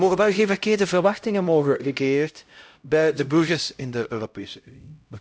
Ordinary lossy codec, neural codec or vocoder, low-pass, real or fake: none; codec, 16 kHz, 0.5 kbps, X-Codec, HuBERT features, trained on LibriSpeech; none; fake